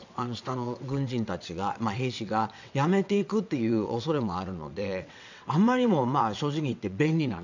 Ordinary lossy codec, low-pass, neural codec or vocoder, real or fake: none; 7.2 kHz; vocoder, 22.05 kHz, 80 mel bands, WaveNeXt; fake